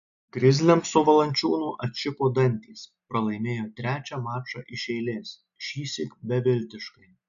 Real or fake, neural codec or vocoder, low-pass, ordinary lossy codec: real; none; 7.2 kHz; MP3, 96 kbps